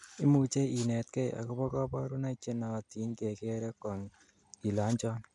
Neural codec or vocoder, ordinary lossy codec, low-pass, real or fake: none; none; 10.8 kHz; real